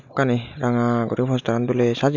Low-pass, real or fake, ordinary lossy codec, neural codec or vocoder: 7.2 kHz; real; none; none